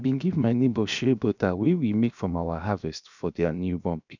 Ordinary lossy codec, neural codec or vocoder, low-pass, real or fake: none; codec, 16 kHz, 0.7 kbps, FocalCodec; 7.2 kHz; fake